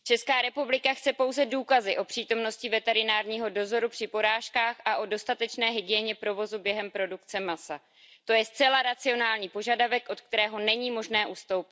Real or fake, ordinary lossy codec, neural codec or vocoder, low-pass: real; none; none; none